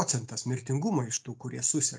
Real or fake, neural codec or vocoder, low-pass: real; none; 9.9 kHz